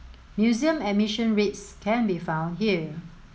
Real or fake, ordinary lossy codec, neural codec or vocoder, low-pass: real; none; none; none